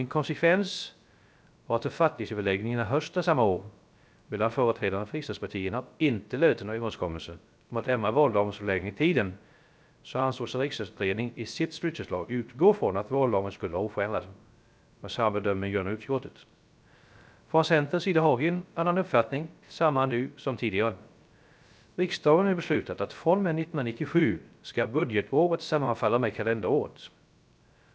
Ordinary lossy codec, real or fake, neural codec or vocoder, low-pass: none; fake; codec, 16 kHz, 0.3 kbps, FocalCodec; none